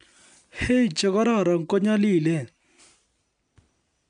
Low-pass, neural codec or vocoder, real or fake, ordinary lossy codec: 9.9 kHz; none; real; MP3, 96 kbps